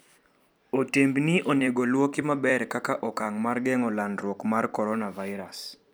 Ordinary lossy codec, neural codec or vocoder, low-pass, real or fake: none; vocoder, 44.1 kHz, 128 mel bands every 256 samples, BigVGAN v2; none; fake